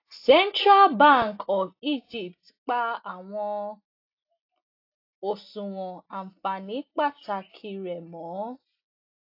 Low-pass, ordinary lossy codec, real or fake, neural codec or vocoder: 5.4 kHz; AAC, 32 kbps; real; none